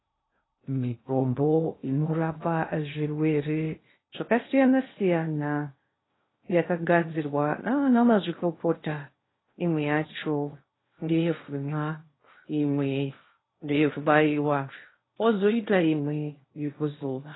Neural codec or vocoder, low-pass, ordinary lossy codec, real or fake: codec, 16 kHz in and 24 kHz out, 0.6 kbps, FocalCodec, streaming, 2048 codes; 7.2 kHz; AAC, 16 kbps; fake